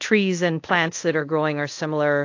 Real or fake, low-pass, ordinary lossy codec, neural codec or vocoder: fake; 7.2 kHz; AAC, 48 kbps; codec, 24 kHz, 0.5 kbps, DualCodec